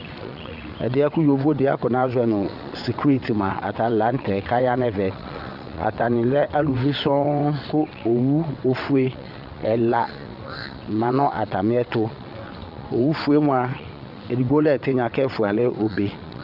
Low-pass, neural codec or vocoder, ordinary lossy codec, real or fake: 5.4 kHz; vocoder, 22.05 kHz, 80 mel bands, WaveNeXt; Opus, 64 kbps; fake